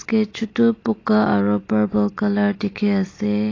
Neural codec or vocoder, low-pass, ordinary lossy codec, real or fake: none; 7.2 kHz; AAC, 32 kbps; real